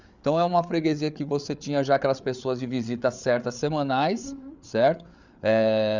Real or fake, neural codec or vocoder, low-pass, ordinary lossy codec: fake; codec, 16 kHz, 8 kbps, FreqCodec, larger model; 7.2 kHz; Opus, 64 kbps